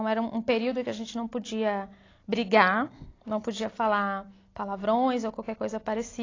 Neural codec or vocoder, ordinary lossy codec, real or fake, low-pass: none; AAC, 32 kbps; real; 7.2 kHz